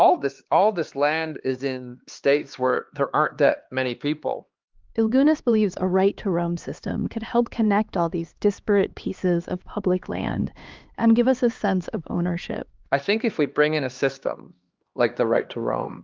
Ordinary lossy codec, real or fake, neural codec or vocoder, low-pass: Opus, 32 kbps; fake; codec, 16 kHz, 2 kbps, X-Codec, HuBERT features, trained on LibriSpeech; 7.2 kHz